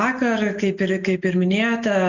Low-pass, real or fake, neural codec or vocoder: 7.2 kHz; real; none